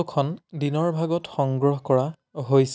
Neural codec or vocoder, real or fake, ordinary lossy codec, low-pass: none; real; none; none